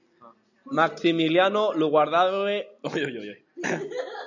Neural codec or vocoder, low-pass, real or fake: none; 7.2 kHz; real